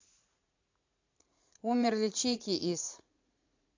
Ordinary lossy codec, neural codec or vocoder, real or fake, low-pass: AAC, 48 kbps; vocoder, 44.1 kHz, 80 mel bands, Vocos; fake; 7.2 kHz